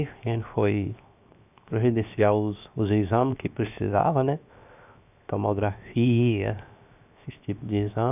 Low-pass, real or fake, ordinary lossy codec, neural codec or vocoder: 3.6 kHz; fake; none; codec, 16 kHz, 0.7 kbps, FocalCodec